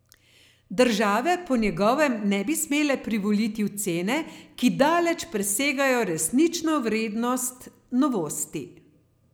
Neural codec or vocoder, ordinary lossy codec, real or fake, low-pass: none; none; real; none